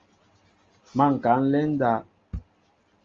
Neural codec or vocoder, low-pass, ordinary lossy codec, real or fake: none; 7.2 kHz; Opus, 32 kbps; real